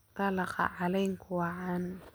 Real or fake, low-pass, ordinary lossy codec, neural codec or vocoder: real; none; none; none